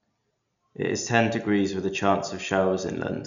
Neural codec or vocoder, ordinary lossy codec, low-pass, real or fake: none; none; 7.2 kHz; real